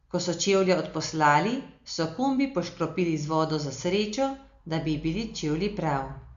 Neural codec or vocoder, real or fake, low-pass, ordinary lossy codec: none; real; 7.2 kHz; Opus, 64 kbps